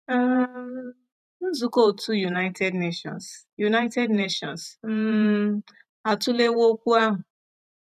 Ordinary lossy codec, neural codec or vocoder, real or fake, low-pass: none; vocoder, 44.1 kHz, 128 mel bands every 512 samples, BigVGAN v2; fake; 14.4 kHz